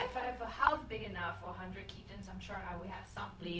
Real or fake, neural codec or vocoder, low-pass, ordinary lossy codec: fake; codec, 16 kHz, 0.4 kbps, LongCat-Audio-Codec; none; none